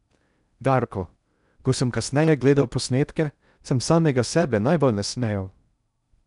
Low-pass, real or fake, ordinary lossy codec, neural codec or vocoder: 10.8 kHz; fake; none; codec, 16 kHz in and 24 kHz out, 0.6 kbps, FocalCodec, streaming, 4096 codes